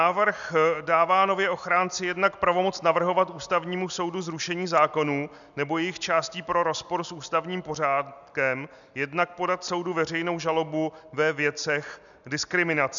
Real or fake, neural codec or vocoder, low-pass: real; none; 7.2 kHz